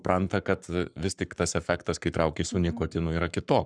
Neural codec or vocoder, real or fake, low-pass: codec, 44.1 kHz, 7.8 kbps, Pupu-Codec; fake; 9.9 kHz